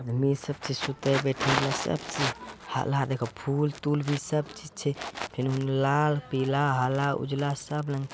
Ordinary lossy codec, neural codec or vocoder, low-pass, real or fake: none; none; none; real